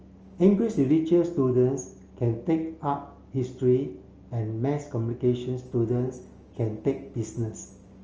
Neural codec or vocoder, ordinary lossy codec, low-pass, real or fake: none; Opus, 24 kbps; 7.2 kHz; real